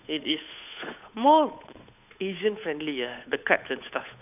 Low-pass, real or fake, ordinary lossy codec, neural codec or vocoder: 3.6 kHz; fake; none; codec, 16 kHz, 8 kbps, FunCodec, trained on Chinese and English, 25 frames a second